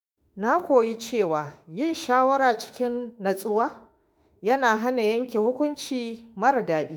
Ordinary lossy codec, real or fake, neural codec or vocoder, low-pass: none; fake; autoencoder, 48 kHz, 32 numbers a frame, DAC-VAE, trained on Japanese speech; none